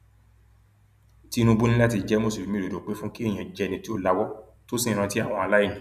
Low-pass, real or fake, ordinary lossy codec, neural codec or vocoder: 14.4 kHz; real; none; none